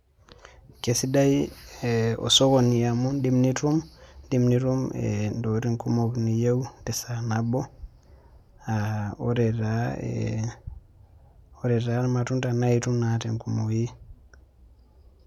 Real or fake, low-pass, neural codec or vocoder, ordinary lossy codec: real; 19.8 kHz; none; none